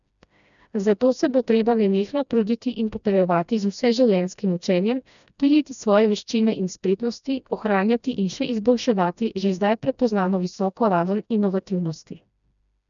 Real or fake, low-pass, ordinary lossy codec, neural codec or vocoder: fake; 7.2 kHz; none; codec, 16 kHz, 1 kbps, FreqCodec, smaller model